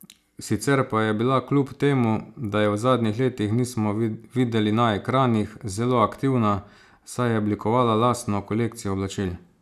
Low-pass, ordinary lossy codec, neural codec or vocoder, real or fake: 14.4 kHz; none; none; real